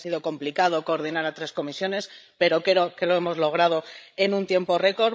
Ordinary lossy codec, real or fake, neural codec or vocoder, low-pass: none; fake; codec, 16 kHz, 16 kbps, FreqCodec, larger model; none